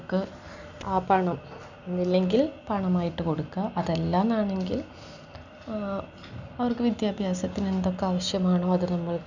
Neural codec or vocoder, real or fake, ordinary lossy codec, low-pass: none; real; none; 7.2 kHz